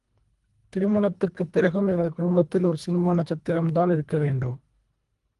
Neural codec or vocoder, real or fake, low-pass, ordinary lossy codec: codec, 24 kHz, 1.5 kbps, HILCodec; fake; 10.8 kHz; Opus, 32 kbps